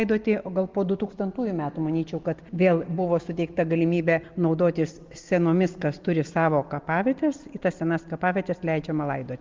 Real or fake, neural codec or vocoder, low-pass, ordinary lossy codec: real; none; 7.2 kHz; Opus, 32 kbps